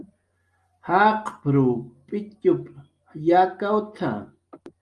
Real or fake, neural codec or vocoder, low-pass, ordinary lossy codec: real; none; 10.8 kHz; Opus, 32 kbps